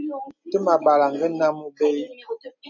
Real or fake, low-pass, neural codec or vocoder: real; 7.2 kHz; none